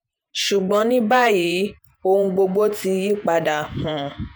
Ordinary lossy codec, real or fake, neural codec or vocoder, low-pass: none; fake; vocoder, 48 kHz, 128 mel bands, Vocos; none